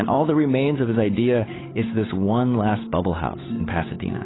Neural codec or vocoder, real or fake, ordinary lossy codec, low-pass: codec, 16 kHz, 8 kbps, FunCodec, trained on Chinese and English, 25 frames a second; fake; AAC, 16 kbps; 7.2 kHz